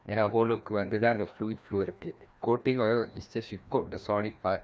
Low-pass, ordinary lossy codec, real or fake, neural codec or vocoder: none; none; fake; codec, 16 kHz, 1 kbps, FreqCodec, larger model